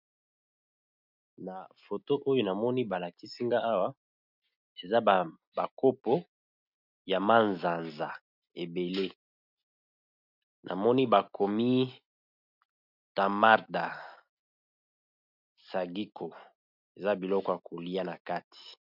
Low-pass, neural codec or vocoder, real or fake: 5.4 kHz; none; real